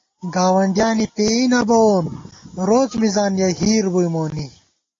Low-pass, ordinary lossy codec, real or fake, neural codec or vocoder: 7.2 kHz; AAC, 32 kbps; real; none